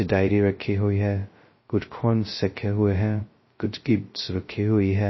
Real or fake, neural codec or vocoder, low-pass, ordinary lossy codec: fake; codec, 16 kHz, 0.2 kbps, FocalCodec; 7.2 kHz; MP3, 24 kbps